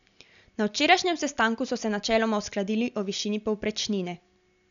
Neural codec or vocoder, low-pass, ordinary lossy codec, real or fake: none; 7.2 kHz; none; real